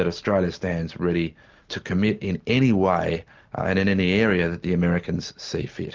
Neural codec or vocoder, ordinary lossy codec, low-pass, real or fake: none; Opus, 32 kbps; 7.2 kHz; real